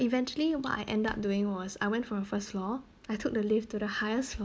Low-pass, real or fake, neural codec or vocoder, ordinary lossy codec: none; real; none; none